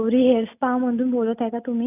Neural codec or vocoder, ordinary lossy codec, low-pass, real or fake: none; none; 3.6 kHz; real